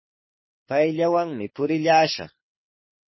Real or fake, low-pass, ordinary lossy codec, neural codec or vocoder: fake; 7.2 kHz; MP3, 24 kbps; codec, 24 kHz, 6 kbps, HILCodec